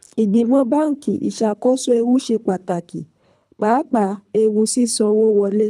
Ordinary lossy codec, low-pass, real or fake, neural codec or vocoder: none; none; fake; codec, 24 kHz, 3 kbps, HILCodec